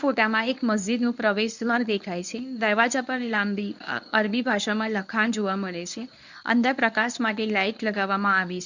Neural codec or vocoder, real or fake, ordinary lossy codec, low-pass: codec, 24 kHz, 0.9 kbps, WavTokenizer, medium speech release version 1; fake; none; 7.2 kHz